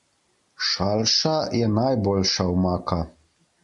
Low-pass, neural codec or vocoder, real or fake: 10.8 kHz; none; real